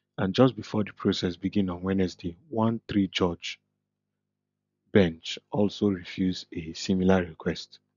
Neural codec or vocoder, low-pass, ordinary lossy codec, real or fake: none; 7.2 kHz; none; real